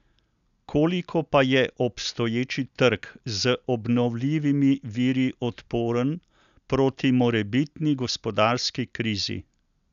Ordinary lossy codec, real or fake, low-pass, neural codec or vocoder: none; real; 7.2 kHz; none